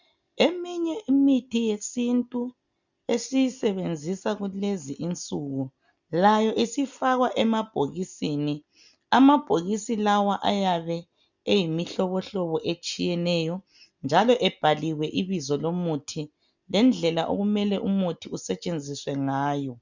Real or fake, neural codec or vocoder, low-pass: real; none; 7.2 kHz